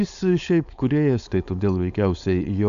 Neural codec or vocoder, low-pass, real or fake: codec, 16 kHz, 4.8 kbps, FACodec; 7.2 kHz; fake